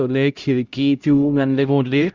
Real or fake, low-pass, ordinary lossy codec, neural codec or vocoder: fake; 7.2 kHz; Opus, 32 kbps; codec, 16 kHz, 0.5 kbps, X-Codec, HuBERT features, trained on LibriSpeech